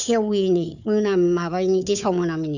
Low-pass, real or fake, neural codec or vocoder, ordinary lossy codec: 7.2 kHz; fake; codec, 16 kHz, 4 kbps, FunCodec, trained on Chinese and English, 50 frames a second; none